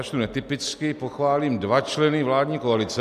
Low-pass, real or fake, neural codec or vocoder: 14.4 kHz; real; none